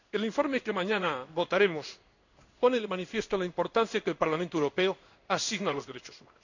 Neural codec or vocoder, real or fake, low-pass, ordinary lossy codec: codec, 16 kHz in and 24 kHz out, 1 kbps, XY-Tokenizer; fake; 7.2 kHz; none